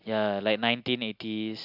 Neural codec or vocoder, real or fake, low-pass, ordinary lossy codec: none; real; 5.4 kHz; none